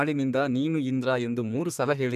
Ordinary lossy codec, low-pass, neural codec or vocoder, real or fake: none; 14.4 kHz; codec, 32 kHz, 1.9 kbps, SNAC; fake